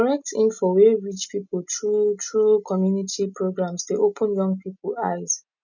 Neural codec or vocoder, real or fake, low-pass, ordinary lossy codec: none; real; 7.2 kHz; none